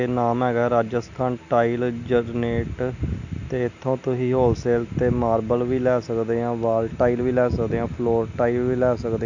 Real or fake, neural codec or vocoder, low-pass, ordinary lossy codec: real; none; 7.2 kHz; none